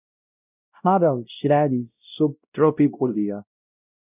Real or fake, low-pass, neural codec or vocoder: fake; 3.6 kHz; codec, 16 kHz, 0.5 kbps, X-Codec, WavLM features, trained on Multilingual LibriSpeech